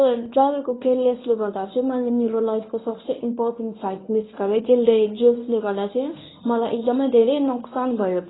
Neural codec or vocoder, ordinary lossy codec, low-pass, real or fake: codec, 24 kHz, 0.9 kbps, WavTokenizer, medium speech release version 1; AAC, 16 kbps; 7.2 kHz; fake